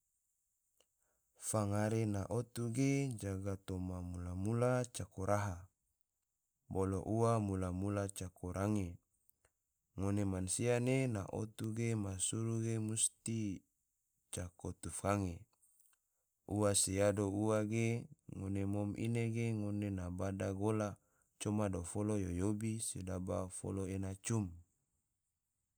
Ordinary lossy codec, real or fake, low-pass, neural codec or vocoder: none; real; none; none